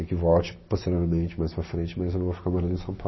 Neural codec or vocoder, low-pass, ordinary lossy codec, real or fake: none; 7.2 kHz; MP3, 24 kbps; real